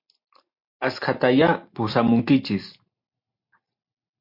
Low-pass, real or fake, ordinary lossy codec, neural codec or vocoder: 5.4 kHz; real; MP3, 32 kbps; none